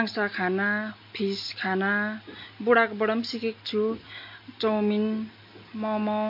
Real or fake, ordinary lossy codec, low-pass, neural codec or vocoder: real; MP3, 32 kbps; 5.4 kHz; none